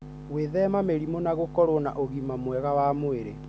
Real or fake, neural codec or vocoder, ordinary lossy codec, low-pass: real; none; none; none